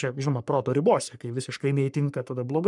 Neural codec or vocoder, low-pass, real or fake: codec, 44.1 kHz, 3.4 kbps, Pupu-Codec; 10.8 kHz; fake